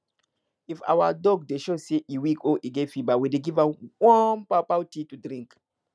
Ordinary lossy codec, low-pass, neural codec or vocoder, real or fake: none; none; none; real